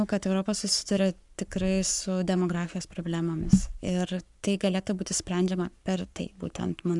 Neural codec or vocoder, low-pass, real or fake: codec, 44.1 kHz, 7.8 kbps, Pupu-Codec; 10.8 kHz; fake